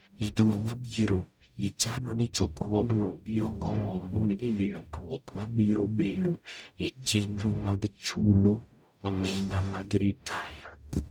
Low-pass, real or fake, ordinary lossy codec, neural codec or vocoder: none; fake; none; codec, 44.1 kHz, 0.9 kbps, DAC